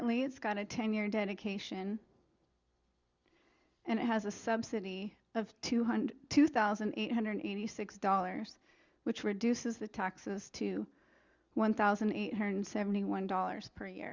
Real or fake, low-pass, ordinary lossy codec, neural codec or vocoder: real; 7.2 kHz; Opus, 64 kbps; none